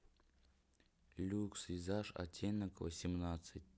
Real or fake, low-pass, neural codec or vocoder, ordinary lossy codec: real; none; none; none